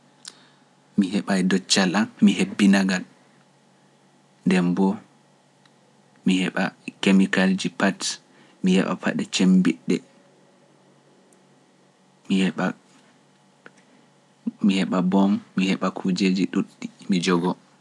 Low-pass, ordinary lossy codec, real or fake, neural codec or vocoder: none; none; real; none